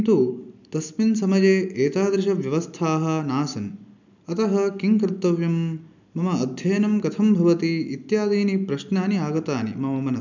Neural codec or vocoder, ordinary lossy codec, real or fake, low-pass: none; none; real; 7.2 kHz